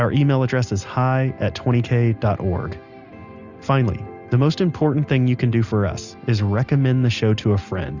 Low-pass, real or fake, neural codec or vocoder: 7.2 kHz; real; none